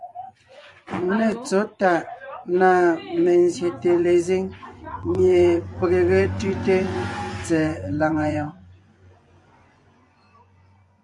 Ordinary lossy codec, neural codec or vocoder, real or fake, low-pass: AAC, 64 kbps; vocoder, 24 kHz, 100 mel bands, Vocos; fake; 10.8 kHz